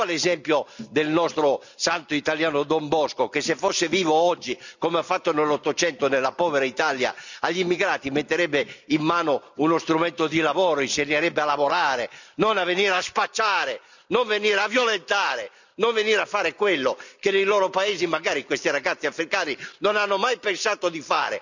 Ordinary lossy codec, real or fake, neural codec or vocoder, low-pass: none; real; none; 7.2 kHz